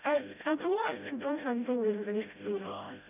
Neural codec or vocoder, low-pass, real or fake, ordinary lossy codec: codec, 16 kHz, 0.5 kbps, FreqCodec, smaller model; 3.6 kHz; fake; none